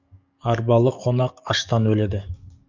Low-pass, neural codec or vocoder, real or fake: 7.2 kHz; codec, 44.1 kHz, 7.8 kbps, DAC; fake